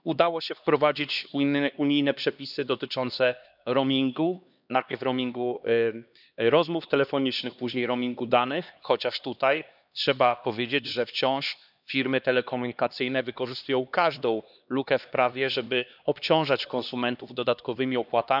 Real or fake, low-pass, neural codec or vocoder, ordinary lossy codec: fake; 5.4 kHz; codec, 16 kHz, 2 kbps, X-Codec, HuBERT features, trained on LibriSpeech; none